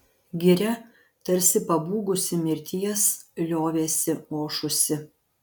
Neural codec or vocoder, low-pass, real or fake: none; 19.8 kHz; real